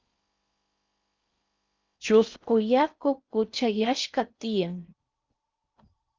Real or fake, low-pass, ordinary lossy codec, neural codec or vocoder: fake; 7.2 kHz; Opus, 24 kbps; codec, 16 kHz in and 24 kHz out, 0.6 kbps, FocalCodec, streaming, 4096 codes